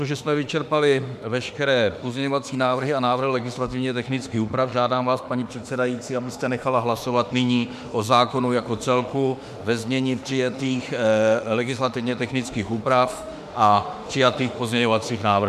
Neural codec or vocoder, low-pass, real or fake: autoencoder, 48 kHz, 32 numbers a frame, DAC-VAE, trained on Japanese speech; 14.4 kHz; fake